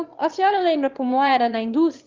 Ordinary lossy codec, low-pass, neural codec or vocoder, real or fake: Opus, 32 kbps; 7.2 kHz; autoencoder, 22.05 kHz, a latent of 192 numbers a frame, VITS, trained on one speaker; fake